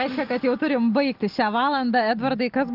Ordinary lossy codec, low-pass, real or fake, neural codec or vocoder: Opus, 32 kbps; 5.4 kHz; real; none